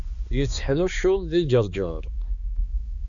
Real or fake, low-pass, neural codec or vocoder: fake; 7.2 kHz; codec, 16 kHz, 2 kbps, X-Codec, HuBERT features, trained on balanced general audio